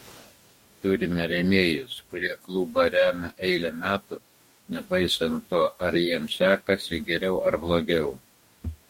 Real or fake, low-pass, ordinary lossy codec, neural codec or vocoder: fake; 19.8 kHz; MP3, 64 kbps; codec, 44.1 kHz, 2.6 kbps, DAC